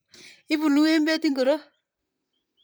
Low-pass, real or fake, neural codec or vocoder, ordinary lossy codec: none; fake; vocoder, 44.1 kHz, 128 mel bands, Pupu-Vocoder; none